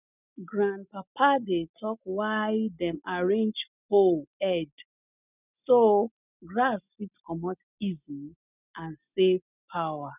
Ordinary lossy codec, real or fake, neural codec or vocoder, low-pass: none; real; none; 3.6 kHz